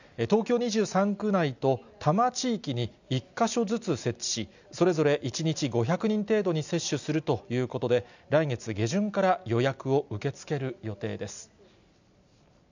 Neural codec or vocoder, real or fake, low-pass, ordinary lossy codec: none; real; 7.2 kHz; none